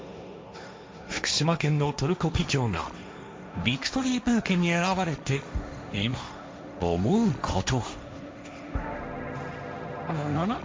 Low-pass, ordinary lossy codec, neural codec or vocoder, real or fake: none; none; codec, 16 kHz, 1.1 kbps, Voila-Tokenizer; fake